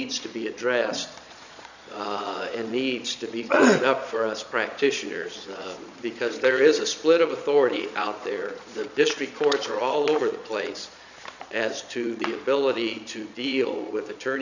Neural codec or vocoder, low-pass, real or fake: vocoder, 22.05 kHz, 80 mel bands, Vocos; 7.2 kHz; fake